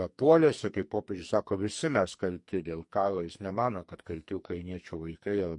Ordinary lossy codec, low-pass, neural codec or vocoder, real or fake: MP3, 48 kbps; 10.8 kHz; codec, 44.1 kHz, 2.6 kbps, SNAC; fake